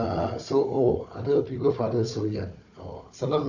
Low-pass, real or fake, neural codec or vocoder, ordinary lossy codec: 7.2 kHz; fake; codec, 16 kHz, 4 kbps, FunCodec, trained on Chinese and English, 50 frames a second; none